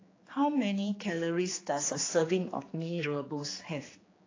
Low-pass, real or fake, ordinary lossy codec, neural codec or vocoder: 7.2 kHz; fake; AAC, 32 kbps; codec, 16 kHz, 2 kbps, X-Codec, HuBERT features, trained on general audio